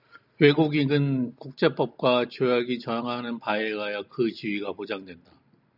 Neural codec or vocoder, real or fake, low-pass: none; real; 5.4 kHz